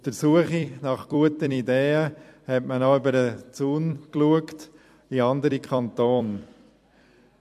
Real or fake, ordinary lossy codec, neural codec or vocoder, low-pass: fake; MP3, 64 kbps; vocoder, 44.1 kHz, 128 mel bands every 256 samples, BigVGAN v2; 14.4 kHz